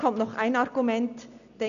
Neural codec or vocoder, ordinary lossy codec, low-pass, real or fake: none; none; 7.2 kHz; real